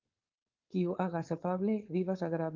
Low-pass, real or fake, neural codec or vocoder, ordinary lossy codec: 7.2 kHz; fake; codec, 16 kHz, 4.8 kbps, FACodec; Opus, 24 kbps